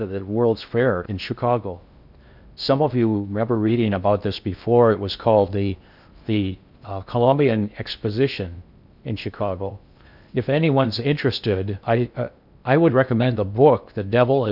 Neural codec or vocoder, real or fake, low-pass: codec, 16 kHz in and 24 kHz out, 0.6 kbps, FocalCodec, streaming, 4096 codes; fake; 5.4 kHz